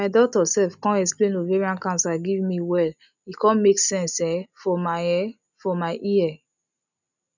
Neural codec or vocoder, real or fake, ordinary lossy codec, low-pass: none; real; none; 7.2 kHz